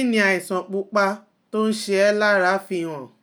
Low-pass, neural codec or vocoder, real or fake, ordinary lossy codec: none; none; real; none